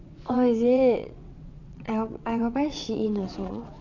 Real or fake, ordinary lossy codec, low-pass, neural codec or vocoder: fake; none; 7.2 kHz; vocoder, 22.05 kHz, 80 mel bands, WaveNeXt